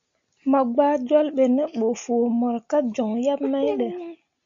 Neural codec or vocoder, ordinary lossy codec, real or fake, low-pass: none; MP3, 48 kbps; real; 7.2 kHz